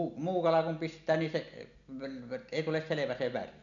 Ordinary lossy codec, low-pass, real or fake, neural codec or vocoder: none; 7.2 kHz; real; none